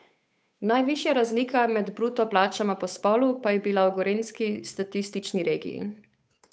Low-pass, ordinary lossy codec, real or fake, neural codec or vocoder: none; none; fake; codec, 16 kHz, 8 kbps, FunCodec, trained on Chinese and English, 25 frames a second